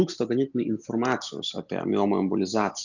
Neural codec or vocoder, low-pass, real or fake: none; 7.2 kHz; real